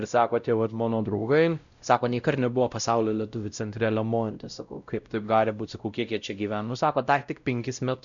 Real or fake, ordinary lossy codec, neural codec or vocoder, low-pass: fake; MP3, 96 kbps; codec, 16 kHz, 0.5 kbps, X-Codec, WavLM features, trained on Multilingual LibriSpeech; 7.2 kHz